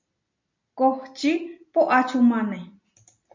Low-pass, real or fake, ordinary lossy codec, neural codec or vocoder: 7.2 kHz; real; MP3, 48 kbps; none